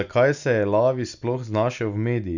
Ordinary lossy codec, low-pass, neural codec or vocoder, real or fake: none; 7.2 kHz; none; real